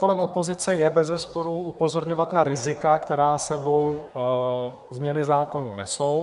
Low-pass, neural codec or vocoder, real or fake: 10.8 kHz; codec, 24 kHz, 1 kbps, SNAC; fake